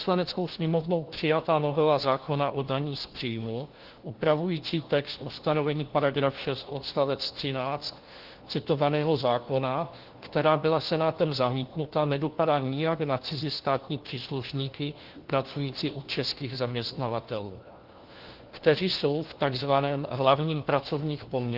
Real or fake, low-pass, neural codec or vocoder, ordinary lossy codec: fake; 5.4 kHz; codec, 16 kHz, 1 kbps, FunCodec, trained on LibriTTS, 50 frames a second; Opus, 16 kbps